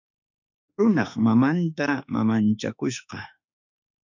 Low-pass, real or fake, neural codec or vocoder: 7.2 kHz; fake; autoencoder, 48 kHz, 32 numbers a frame, DAC-VAE, trained on Japanese speech